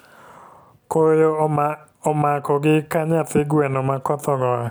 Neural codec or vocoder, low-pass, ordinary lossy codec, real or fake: none; none; none; real